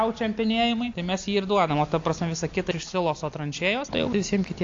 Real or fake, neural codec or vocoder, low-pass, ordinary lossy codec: real; none; 7.2 kHz; AAC, 48 kbps